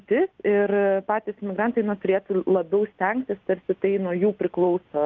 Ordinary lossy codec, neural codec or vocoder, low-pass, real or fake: Opus, 24 kbps; none; 7.2 kHz; real